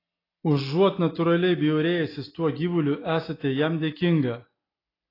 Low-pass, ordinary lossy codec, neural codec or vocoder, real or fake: 5.4 kHz; AAC, 24 kbps; none; real